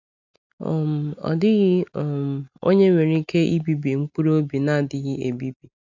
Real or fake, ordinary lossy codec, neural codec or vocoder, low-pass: real; none; none; 7.2 kHz